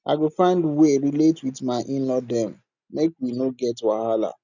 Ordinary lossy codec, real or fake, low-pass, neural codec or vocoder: none; real; 7.2 kHz; none